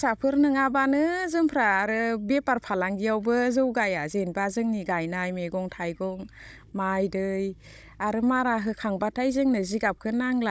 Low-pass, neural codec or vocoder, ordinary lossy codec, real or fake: none; codec, 16 kHz, 16 kbps, FunCodec, trained on Chinese and English, 50 frames a second; none; fake